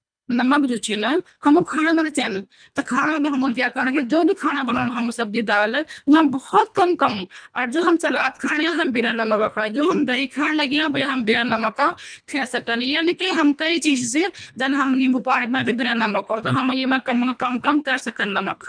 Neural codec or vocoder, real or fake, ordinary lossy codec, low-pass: codec, 24 kHz, 1.5 kbps, HILCodec; fake; none; 9.9 kHz